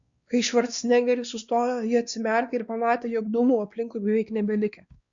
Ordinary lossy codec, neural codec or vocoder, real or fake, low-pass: Opus, 64 kbps; codec, 16 kHz, 2 kbps, X-Codec, WavLM features, trained on Multilingual LibriSpeech; fake; 7.2 kHz